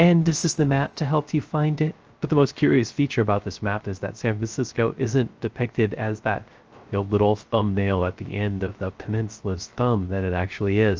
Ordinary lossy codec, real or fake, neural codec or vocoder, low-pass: Opus, 24 kbps; fake; codec, 16 kHz, 0.3 kbps, FocalCodec; 7.2 kHz